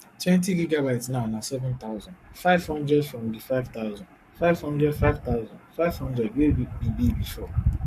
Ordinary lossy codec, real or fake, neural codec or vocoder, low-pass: none; fake; codec, 44.1 kHz, 7.8 kbps, Pupu-Codec; 14.4 kHz